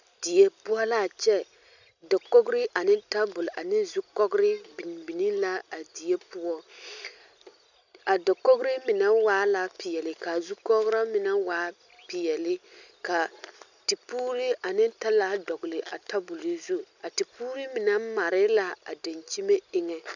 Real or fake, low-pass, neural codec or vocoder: real; 7.2 kHz; none